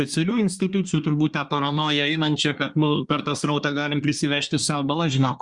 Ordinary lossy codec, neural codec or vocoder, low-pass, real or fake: Opus, 64 kbps; codec, 24 kHz, 1 kbps, SNAC; 10.8 kHz; fake